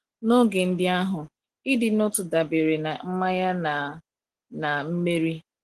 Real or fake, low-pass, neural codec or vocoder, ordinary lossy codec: real; 10.8 kHz; none; Opus, 16 kbps